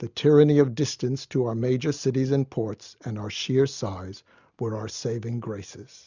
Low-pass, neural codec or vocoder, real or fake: 7.2 kHz; none; real